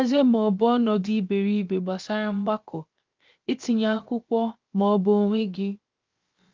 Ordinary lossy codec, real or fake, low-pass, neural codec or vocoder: Opus, 24 kbps; fake; 7.2 kHz; codec, 16 kHz, 0.7 kbps, FocalCodec